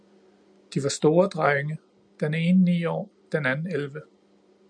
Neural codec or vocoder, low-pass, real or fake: none; 9.9 kHz; real